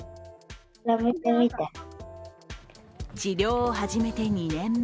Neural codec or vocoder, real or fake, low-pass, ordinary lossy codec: none; real; none; none